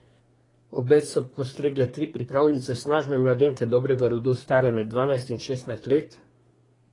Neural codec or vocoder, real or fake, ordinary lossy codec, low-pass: codec, 24 kHz, 1 kbps, SNAC; fake; AAC, 32 kbps; 10.8 kHz